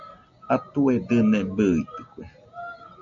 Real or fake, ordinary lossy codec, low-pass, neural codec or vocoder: real; MP3, 48 kbps; 7.2 kHz; none